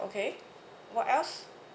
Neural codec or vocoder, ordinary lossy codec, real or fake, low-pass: none; none; real; none